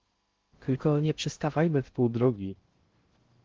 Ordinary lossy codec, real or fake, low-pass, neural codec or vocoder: Opus, 16 kbps; fake; 7.2 kHz; codec, 16 kHz in and 24 kHz out, 0.6 kbps, FocalCodec, streaming, 2048 codes